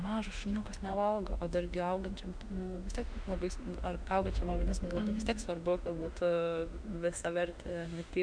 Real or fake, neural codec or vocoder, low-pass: fake; autoencoder, 48 kHz, 32 numbers a frame, DAC-VAE, trained on Japanese speech; 9.9 kHz